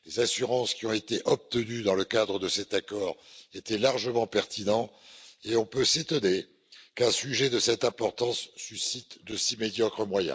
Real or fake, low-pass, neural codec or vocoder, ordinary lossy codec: real; none; none; none